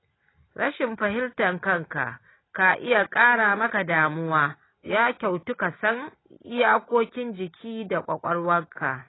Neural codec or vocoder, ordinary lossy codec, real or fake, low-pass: none; AAC, 16 kbps; real; 7.2 kHz